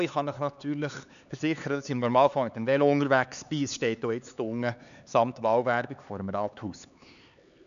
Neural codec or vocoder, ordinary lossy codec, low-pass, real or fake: codec, 16 kHz, 4 kbps, X-Codec, HuBERT features, trained on LibriSpeech; none; 7.2 kHz; fake